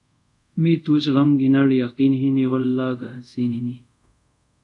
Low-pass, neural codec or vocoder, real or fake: 10.8 kHz; codec, 24 kHz, 0.5 kbps, DualCodec; fake